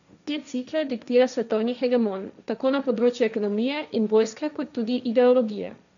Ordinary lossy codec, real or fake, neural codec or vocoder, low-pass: none; fake; codec, 16 kHz, 1.1 kbps, Voila-Tokenizer; 7.2 kHz